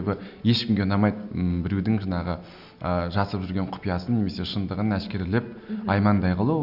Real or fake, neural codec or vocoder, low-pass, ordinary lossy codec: real; none; 5.4 kHz; none